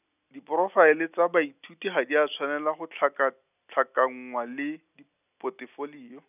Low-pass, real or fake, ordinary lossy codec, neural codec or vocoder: 3.6 kHz; real; none; none